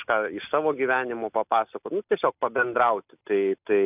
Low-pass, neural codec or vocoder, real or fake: 3.6 kHz; none; real